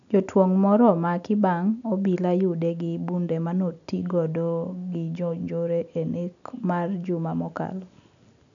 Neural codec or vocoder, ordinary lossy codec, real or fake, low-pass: none; none; real; 7.2 kHz